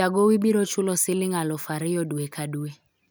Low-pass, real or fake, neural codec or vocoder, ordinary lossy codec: none; real; none; none